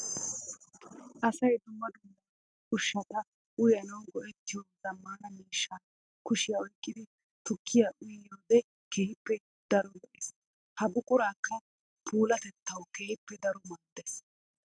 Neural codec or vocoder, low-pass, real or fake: none; 9.9 kHz; real